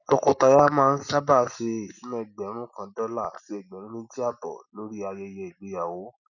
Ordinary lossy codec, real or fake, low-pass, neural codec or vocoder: none; real; 7.2 kHz; none